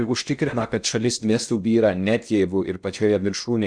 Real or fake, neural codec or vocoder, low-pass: fake; codec, 16 kHz in and 24 kHz out, 0.6 kbps, FocalCodec, streaming, 2048 codes; 9.9 kHz